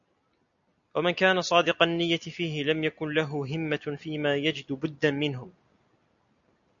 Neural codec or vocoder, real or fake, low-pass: none; real; 7.2 kHz